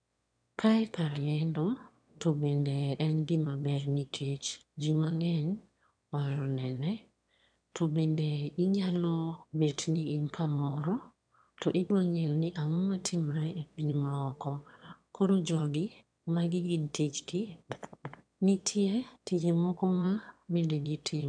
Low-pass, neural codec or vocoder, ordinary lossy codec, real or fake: 9.9 kHz; autoencoder, 22.05 kHz, a latent of 192 numbers a frame, VITS, trained on one speaker; MP3, 96 kbps; fake